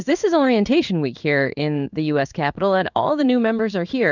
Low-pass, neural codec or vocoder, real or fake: 7.2 kHz; codec, 16 kHz in and 24 kHz out, 1 kbps, XY-Tokenizer; fake